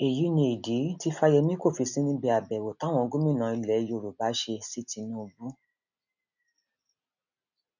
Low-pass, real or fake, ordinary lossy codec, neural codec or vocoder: 7.2 kHz; real; none; none